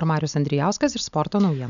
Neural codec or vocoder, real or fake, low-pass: none; real; 7.2 kHz